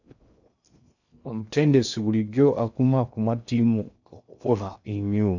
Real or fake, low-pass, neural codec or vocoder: fake; 7.2 kHz; codec, 16 kHz in and 24 kHz out, 0.6 kbps, FocalCodec, streaming, 2048 codes